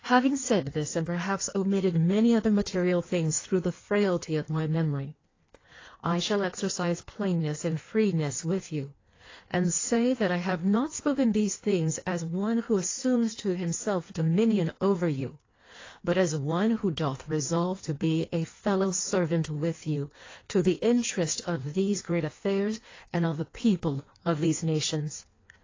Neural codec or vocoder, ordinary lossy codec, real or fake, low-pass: codec, 16 kHz in and 24 kHz out, 1.1 kbps, FireRedTTS-2 codec; AAC, 32 kbps; fake; 7.2 kHz